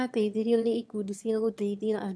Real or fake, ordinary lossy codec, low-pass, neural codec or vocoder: fake; none; none; autoencoder, 22.05 kHz, a latent of 192 numbers a frame, VITS, trained on one speaker